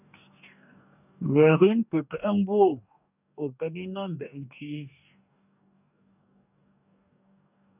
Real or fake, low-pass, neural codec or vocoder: fake; 3.6 kHz; codec, 44.1 kHz, 2.6 kbps, DAC